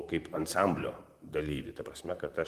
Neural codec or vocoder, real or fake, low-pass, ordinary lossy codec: vocoder, 44.1 kHz, 128 mel bands, Pupu-Vocoder; fake; 14.4 kHz; Opus, 32 kbps